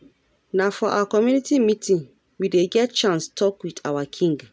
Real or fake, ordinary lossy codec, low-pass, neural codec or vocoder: real; none; none; none